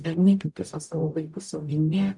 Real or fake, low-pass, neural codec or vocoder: fake; 10.8 kHz; codec, 44.1 kHz, 0.9 kbps, DAC